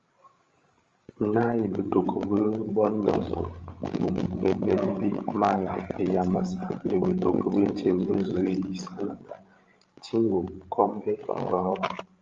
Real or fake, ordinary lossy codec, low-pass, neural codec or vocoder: fake; Opus, 32 kbps; 7.2 kHz; codec, 16 kHz, 16 kbps, FreqCodec, larger model